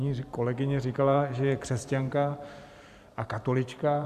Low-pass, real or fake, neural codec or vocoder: 14.4 kHz; fake; vocoder, 44.1 kHz, 128 mel bands every 512 samples, BigVGAN v2